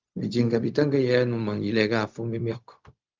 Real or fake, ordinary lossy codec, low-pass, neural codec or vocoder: fake; Opus, 24 kbps; 7.2 kHz; codec, 16 kHz, 0.4 kbps, LongCat-Audio-Codec